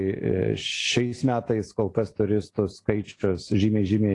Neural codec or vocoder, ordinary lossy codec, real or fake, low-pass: none; AAC, 48 kbps; real; 10.8 kHz